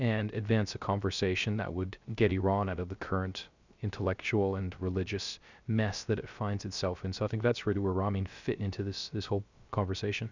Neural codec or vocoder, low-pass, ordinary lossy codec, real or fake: codec, 16 kHz, 0.3 kbps, FocalCodec; 7.2 kHz; Opus, 64 kbps; fake